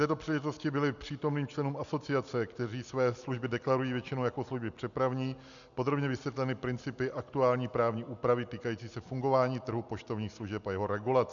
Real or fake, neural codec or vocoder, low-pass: real; none; 7.2 kHz